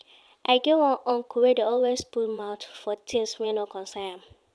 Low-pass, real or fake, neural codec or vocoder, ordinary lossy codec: none; fake; vocoder, 22.05 kHz, 80 mel bands, Vocos; none